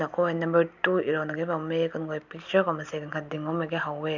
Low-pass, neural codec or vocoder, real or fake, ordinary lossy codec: 7.2 kHz; none; real; none